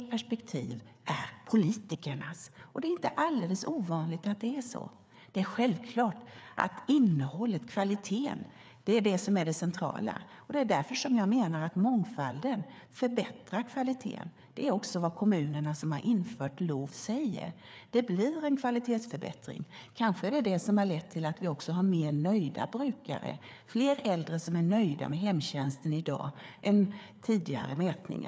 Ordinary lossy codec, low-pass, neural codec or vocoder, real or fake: none; none; codec, 16 kHz, 4 kbps, FreqCodec, larger model; fake